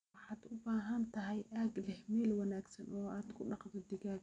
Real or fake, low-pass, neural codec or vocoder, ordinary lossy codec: real; 9.9 kHz; none; none